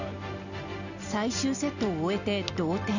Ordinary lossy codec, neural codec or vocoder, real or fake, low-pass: none; none; real; 7.2 kHz